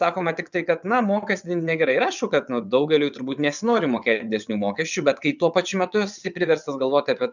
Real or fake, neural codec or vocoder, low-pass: fake; vocoder, 22.05 kHz, 80 mel bands, WaveNeXt; 7.2 kHz